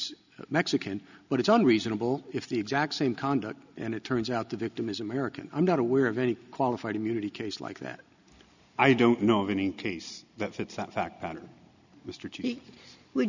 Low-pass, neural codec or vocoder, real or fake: 7.2 kHz; none; real